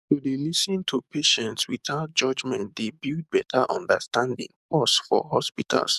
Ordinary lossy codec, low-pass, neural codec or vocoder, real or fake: none; 14.4 kHz; codec, 44.1 kHz, 7.8 kbps, DAC; fake